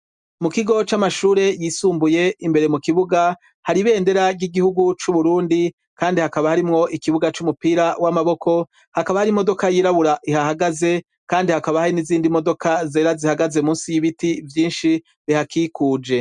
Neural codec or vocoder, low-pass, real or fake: none; 10.8 kHz; real